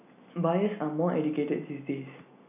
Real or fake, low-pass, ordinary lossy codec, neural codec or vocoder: real; 3.6 kHz; none; none